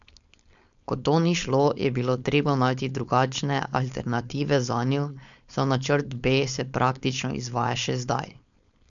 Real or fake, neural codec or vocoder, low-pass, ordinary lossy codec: fake; codec, 16 kHz, 4.8 kbps, FACodec; 7.2 kHz; none